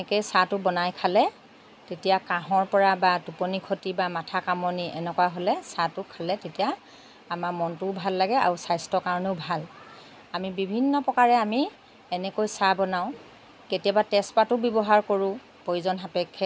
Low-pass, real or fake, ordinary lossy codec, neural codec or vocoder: none; real; none; none